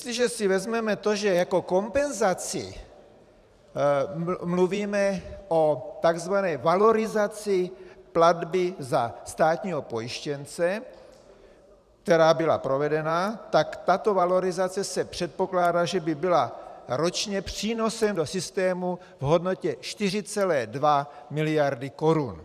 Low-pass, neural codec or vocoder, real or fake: 14.4 kHz; vocoder, 44.1 kHz, 128 mel bands every 512 samples, BigVGAN v2; fake